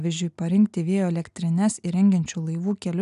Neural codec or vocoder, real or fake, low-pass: none; real; 10.8 kHz